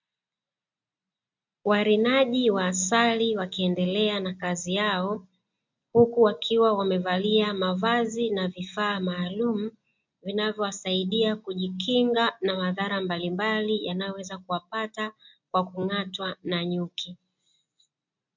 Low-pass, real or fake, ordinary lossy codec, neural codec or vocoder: 7.2 kHz; real; MP3, 64 kbps; none